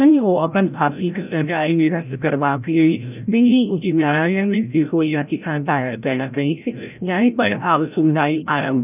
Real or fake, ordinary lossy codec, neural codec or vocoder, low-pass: fake; none; codec, 16 kHz, 0.5 kbps, FreqCodec, larger model; 3.6 kHz